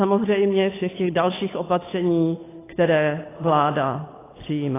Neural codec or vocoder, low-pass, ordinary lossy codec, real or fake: codec, 16 kHz, 8 kbps, FunCodec, trained on Chinese and English, 25 frames a second; 3.6 kHz; AAC, 16 kbps; fake